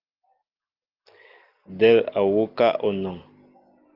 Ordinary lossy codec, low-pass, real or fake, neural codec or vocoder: Opus, 32 kbps; 5.4 kHz; real; none